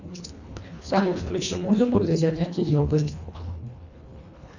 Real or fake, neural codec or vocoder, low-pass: fake; codec, 24 kHz, 1.5 kbps, HILCodec; 7.2 kHz